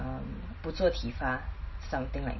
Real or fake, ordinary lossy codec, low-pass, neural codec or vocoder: real; MP3, 24 kbps; 7.2 kHz; none